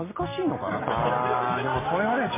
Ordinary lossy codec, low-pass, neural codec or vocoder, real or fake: MP3, 16 kbps; 3.6 kHz; none; real